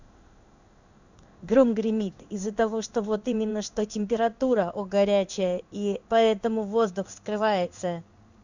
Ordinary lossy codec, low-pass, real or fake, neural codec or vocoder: none; 7.2 kHz; fake; codec, 16 kHz in and 24 kHz out, 1 kbps, XY-Tokenizer